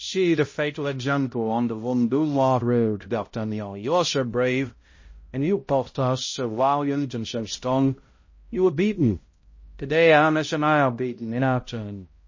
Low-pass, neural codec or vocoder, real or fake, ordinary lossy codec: 7.2 kHz; codec, 16 kHz, 0.5 kbps, X-Codec, HuBERT features, trained on balanced general audio; fake; MP3, 32 kbps